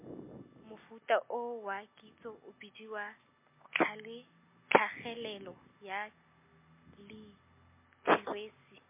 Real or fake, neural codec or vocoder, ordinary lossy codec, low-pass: real; none; MP3, 24 kbps; 3.6 kHz